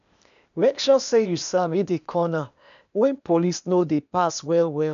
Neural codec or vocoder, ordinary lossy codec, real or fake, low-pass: codec, 16 kHz, 0.8 kbps, ZipCodec; none; fake; 7.2 kHz